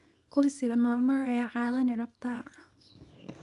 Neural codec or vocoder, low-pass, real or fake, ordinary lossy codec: codec, 24 kHz, 0.9 kbps, WavTokenizer, small release; 10.8 kHz; fake; AAC, 96 kbps